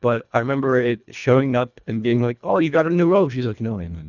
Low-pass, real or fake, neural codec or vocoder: 7.2 kHz; fake; codec, 24 kHz, 1.5 kbps, HILCodec